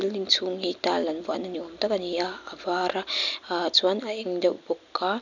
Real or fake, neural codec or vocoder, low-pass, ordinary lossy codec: real; none; 7.2 kHz; none